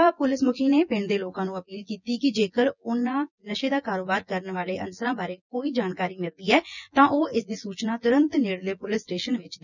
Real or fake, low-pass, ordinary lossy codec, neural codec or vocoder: fake; 7.2 kHz; none; vocoder, 24 kHz, 100 mel bands, Vocos